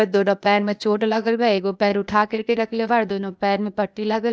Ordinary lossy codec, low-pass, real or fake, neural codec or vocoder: none; none; fake; codec, 16 kHz, 0.8 kbps, ZipCodec